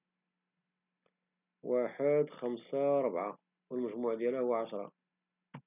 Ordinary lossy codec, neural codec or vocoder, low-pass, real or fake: none; none; 3.6 kHz; real